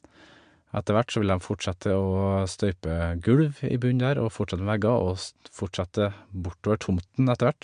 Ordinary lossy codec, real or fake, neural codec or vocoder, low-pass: MP3, 64 kbps; real; none; 9.9 kHz